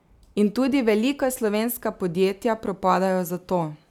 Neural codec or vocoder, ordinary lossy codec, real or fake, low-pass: none; none; real; 19.8 kHz